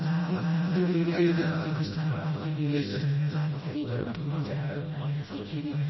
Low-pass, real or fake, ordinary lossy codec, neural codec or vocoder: 7.2 kHz; fake; MP3, 24 kbps; codec, 16 kHz, 0.5 kbps, FreqCodec, smaller model